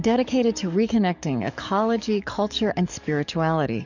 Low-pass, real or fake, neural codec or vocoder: 7.2 kHz; fake; codec, 44.1 kHz, 7.8 kbps, DAC